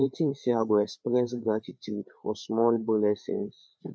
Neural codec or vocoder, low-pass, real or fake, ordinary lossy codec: codec, 16 kHz, 4 kbps, FreqCodec, larger model; none; fake; none